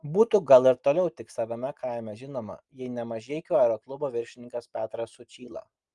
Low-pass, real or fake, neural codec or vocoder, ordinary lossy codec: 10.8 kHz; real; none; Opus, 32 kbps